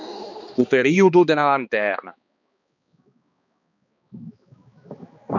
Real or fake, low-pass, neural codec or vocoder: fake; 7.2 kHz; codec, 16 kHz, 2 kbps, X-Codec, HuBERT features, trained on balanced general audio